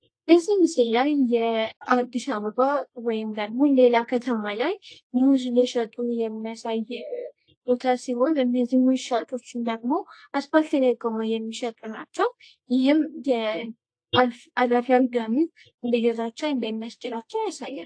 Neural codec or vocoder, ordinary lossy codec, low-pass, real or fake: codec, 24 kHz, 0.9 kbps, WavTokenizer, medium music audio release; AAC, 48 kbps; 9.9 kHz; fake